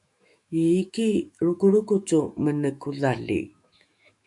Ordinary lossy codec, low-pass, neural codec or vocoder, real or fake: MP3, 96 kbps; 10.8 kHz; autoencoder, 48 kHz, 128 numbers a frame, DAC-VAE, trained on Japanese speech; fake